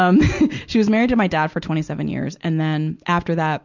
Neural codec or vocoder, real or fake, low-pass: none; real; 7.2 kHz